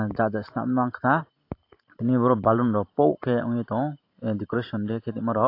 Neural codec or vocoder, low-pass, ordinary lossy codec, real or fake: vocoder, 44.1 kHz, 128 mel bands every 512 samples, BigVGAN v2; 5.4 kHz; AAC, 32 kbps; fake